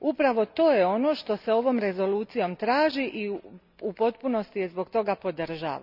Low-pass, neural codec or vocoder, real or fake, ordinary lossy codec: 5.4 kHz; none; real; none